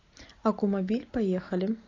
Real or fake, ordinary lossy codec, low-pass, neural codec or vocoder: real; AAC, 32 kbps; 7.2 kHz; none